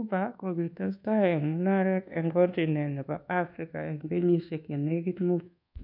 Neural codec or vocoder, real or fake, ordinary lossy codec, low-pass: codec, 24 kHz, 1.2 kbps, DualCodec; fake; none; 5.4 kHz